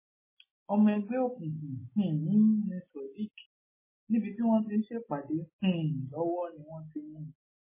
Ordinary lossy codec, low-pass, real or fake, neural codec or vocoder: MP3, 16 kbps; 3.6 kHz; real; none